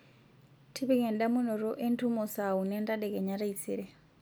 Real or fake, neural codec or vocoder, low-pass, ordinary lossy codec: real; none; none; none